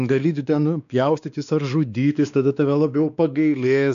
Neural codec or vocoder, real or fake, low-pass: codec, 16 kHz, 2 kbps, X-Codec, WavLM features, trained on Multilingual LibriSpeech; fake; 7.2 kHz